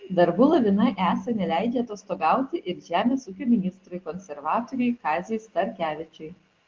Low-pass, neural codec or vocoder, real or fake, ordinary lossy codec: 7.2 kHz; none; real; Opus, 16 kbps